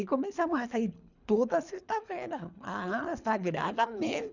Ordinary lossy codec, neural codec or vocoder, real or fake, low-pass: none; codec, 24 kHz, 3 kbps, HILCodec; fake; 7.2 kHz